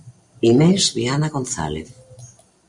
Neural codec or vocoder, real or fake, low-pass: none; real; 10.8 kHz